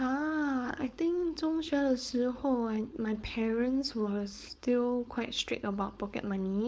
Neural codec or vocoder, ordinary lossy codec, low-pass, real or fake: codec, 16 kHz, 4.8 kbps, FACodec; none; none; fake